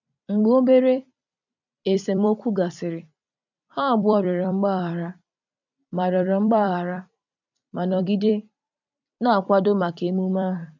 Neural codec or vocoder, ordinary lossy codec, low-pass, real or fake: vocoder, 44.1 kHz, 128 mel bands every 256 samples, BigVGAN v2; none; 7.2 kHz; fake